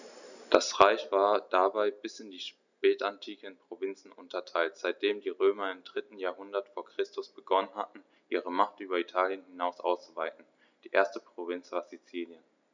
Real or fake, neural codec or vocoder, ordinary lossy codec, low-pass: real; none; none; 7.2 kHz